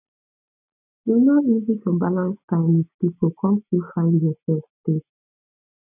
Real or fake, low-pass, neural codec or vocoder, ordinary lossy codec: real; 3.6 kHz; none; none